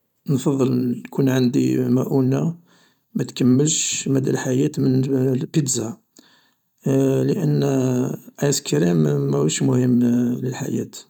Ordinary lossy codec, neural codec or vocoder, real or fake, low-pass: none; vocoder, 44.1 kHz, 128 mel bands every 512 samples, BigVGAN v2; fake; 19.8 kHz